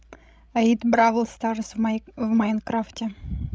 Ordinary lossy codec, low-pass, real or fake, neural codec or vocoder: none; none; fake; codec, 16 kHz, 16 kbps, FreqCodec, larger model